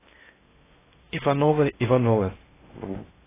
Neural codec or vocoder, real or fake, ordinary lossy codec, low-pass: codec, 16 kHz in and 24 kHz out, 0.6 kbps, FocalCodec, streaming, 4096 codes; fake; AAC, 16 kbps; 3.6 kHz